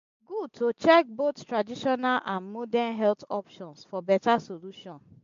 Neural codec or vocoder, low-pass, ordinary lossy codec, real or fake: none; 7.2 kHz; MP3, 48 kbps; real